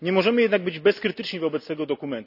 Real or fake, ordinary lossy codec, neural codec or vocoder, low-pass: real; none; none; 5.4 kHz